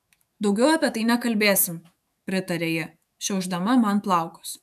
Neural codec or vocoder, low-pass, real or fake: autoencoder, 48 kHz, 128 numbers a frame, DAC-VAE, trained on Japanese speech; 14.4 kHz; fake